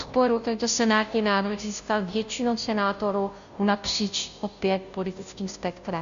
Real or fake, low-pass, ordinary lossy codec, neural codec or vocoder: fake; 7.2 kHz; AAC, 96 kbps; codec, 16 kHz, 0.5 kbps, FunCodec, trained on Chinese and English, 25 frames a second